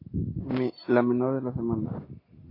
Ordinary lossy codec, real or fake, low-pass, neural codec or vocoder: AAC, 24 kbps; real; 5.4 kHz; none